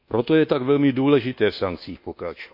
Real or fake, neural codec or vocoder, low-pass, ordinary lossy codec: fake; codec, 24 kHz, 1.2 kbps, DualCodec; 5.4 kHz; none